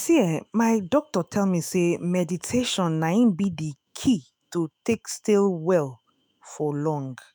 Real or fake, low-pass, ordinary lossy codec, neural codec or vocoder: fake; none; none; autoencoder, 48 kHz, 128 numbers a frame, DAC-VAE, trained on Japanese speech